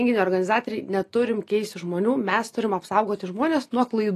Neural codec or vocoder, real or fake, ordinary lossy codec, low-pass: none; real; AAC, 48 kbps; 14.4 kHz